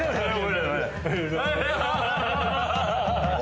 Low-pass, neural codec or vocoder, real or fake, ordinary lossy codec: none; none; real; none